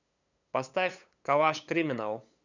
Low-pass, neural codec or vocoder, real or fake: 7.2 kHz; codec, 16 kHz, 8 kbps, FunCodec, trained on LibriTTS, 25 frames a second; fake